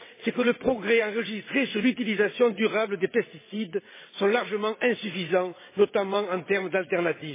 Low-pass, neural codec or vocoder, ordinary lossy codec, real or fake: 3.6 kHz; vocoder, 22.05 kHz, 80 mel bands, WaveNeXt; MP3, 16 kbps; fake